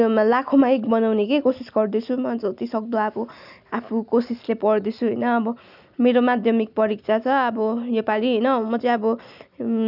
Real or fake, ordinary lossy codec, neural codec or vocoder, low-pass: real; none; none; 5.4 kHz